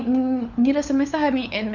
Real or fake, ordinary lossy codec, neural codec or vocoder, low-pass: fake; none; codec, 16 kHz, 4 kbps, FunCodec, trained on LibriTTS, 50 frames a second; 7.2 kHz